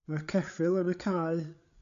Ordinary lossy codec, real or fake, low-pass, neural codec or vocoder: AAC, 64 kbps; fake; 7.2 kHz; codec, 16 kHz, 8 kbps, FreqCodec, larger model